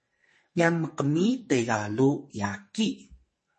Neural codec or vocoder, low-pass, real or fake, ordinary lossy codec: codec, 44.1 kHz, 2.6 kbps, SNAC; 10.8 kHz; fake; MP3, 32 kbps